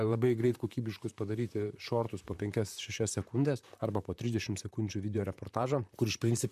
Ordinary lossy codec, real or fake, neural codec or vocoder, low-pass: MP3, 96 kbps; fake; vocoder, 44.1 kHz, 128 mel bands, Pupu-Vocoder; 14.4 kHz